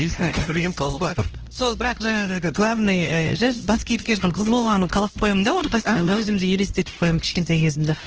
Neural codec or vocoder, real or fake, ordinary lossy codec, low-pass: codec, 16 kHz, 1 kbps, X-Codec, HuBERT features, trained on LibriSpeech; fake; Opus, 16 kbps; 7.2 kHz